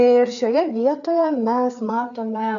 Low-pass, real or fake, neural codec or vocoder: 7.2 kHz; fake; codec, 16 kHz, 4 kbps, FreqCodec, larger model